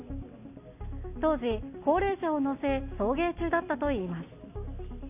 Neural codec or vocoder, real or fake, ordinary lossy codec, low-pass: none; real; none; 3.6 kHz